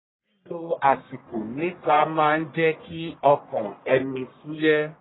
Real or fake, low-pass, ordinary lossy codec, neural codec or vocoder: fake; 7.2 kHz; AAC, 16 kbps; codec, 44.1 kHz, 1.7 kbps, Pupu-Codec